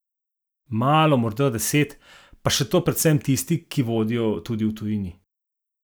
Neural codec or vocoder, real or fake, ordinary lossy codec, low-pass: none; real; none; none